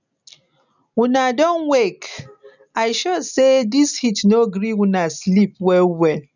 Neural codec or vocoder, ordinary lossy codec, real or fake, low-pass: none; none; real; 7.2 kHz